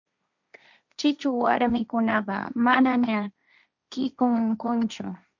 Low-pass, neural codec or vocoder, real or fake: 7.2 kHz; codec, 16 kHz, 1.1 kbps, Voila-Tokenizer; fake